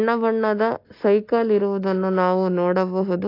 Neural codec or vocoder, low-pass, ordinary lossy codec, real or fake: none; 5.4 kHz; AAC, 32 kbps; real